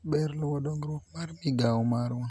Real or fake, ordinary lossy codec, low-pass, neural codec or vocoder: real; none; none; none